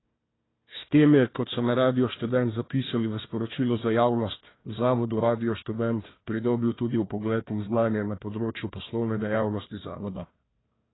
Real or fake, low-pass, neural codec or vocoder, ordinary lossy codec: fake; 7.2 kHz; codec, 16 kHz, 1 kbps, FunCodec, trained on Chinese and English, 50 frames a second; AAC, 16 kbps